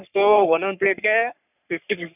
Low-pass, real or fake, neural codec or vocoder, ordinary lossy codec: 3.6 kHz; fake; codec, 44.1 kHz, 3.4 kbps, Pupu-Codec; none